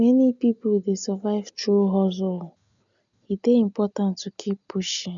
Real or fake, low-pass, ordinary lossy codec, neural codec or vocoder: real; 7.2 kHz; none; none